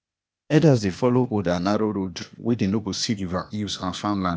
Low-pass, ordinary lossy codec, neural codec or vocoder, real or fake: none; none; codec, 16 kHz, 0.8 kbps, ZipCodec; fake